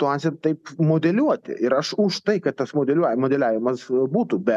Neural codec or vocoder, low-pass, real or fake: none; 14.4 kHz; real